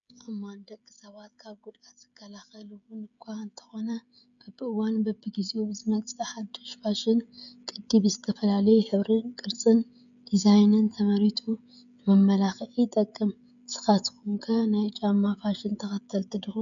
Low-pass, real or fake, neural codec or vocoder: 7.2 kHz; fake; codec, 16 kHz, 16 kbps, FreqCodec, smaller model